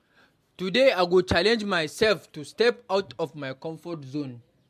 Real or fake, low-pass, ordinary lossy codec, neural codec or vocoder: real; 14.4 kHz; MP3, 64 kbps; none